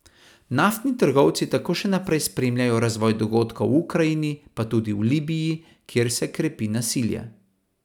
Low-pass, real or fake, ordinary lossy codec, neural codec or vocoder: 19.8 kHz; real; none; none